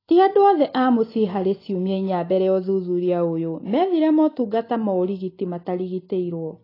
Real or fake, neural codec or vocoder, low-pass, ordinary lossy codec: real; none; 5.4 kHz; AAC, 24 kbps